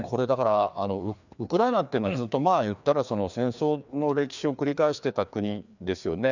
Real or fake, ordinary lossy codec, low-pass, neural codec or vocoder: fake; none; 7.2 kHz; codec, 16 kHz, 2 kbps, FreqCodec, larger model